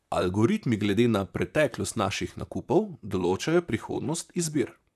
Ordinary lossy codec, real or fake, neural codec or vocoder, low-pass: none; fake; vocoder, 44.1 kHz, 128 mel bands, Pupu-Vocoder; 14.4 kHz